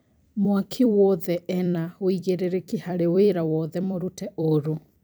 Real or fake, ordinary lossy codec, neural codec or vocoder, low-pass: fake; none; vocoder, 44.1 kHz, 128 mel bands every 256 samples, BigVGAN v2; none